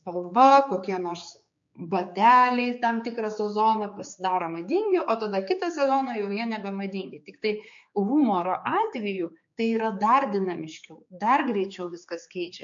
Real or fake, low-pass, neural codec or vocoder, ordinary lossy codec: fake; 7.2 kHz; codec, 16 kHz, 4 kbps, X-Codec, HuBERT features, trained on general audio; MP3, 48 kbps